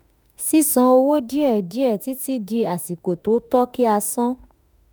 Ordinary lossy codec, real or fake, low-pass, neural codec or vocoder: none; fake; none; autoencoder, 48 kHz, 32 numbers a frame, DAC-VAE, trained on Japanese speech